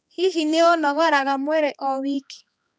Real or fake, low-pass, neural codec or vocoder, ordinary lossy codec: fake; none; codec, 16 kHz, 2 kbps, X-Codec, HuBERT features, trained on balanced general audio; none